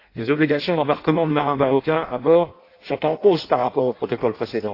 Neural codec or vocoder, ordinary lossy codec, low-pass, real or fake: codec, 16 kHz in and 24 kHz out, 0.6 kbps, FireRedTTS-2 codec; AAC, 32 kbps; 5.4 kHz; fake